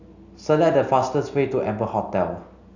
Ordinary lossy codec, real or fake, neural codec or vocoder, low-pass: none; real; none; 7.2 kHz